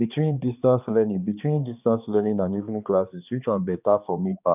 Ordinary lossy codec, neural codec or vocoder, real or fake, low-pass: none; codec, 16 kHz, 2 kbps, X-Codec, HuBERT features, trained on balanced general audio; fake; 3.6 kHz